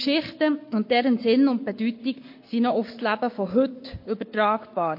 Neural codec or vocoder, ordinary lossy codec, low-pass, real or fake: codec, 44.1 kHz, 7.8 kbps, Pupu-Codec; MP3, 32 kbps; 5.4 kHz; fake